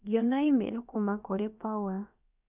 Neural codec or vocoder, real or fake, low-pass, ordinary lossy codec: codec, 16 kHz, about 1 kbps, DyCAST, with the encoder's durations; fake; 3.6 kHz; none